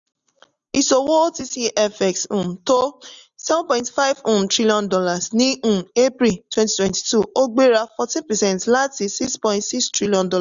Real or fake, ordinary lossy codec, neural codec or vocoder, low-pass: real; none; none; 7.2 kHz